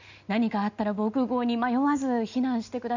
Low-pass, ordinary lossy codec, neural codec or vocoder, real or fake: 7.2 kHz; none; none; real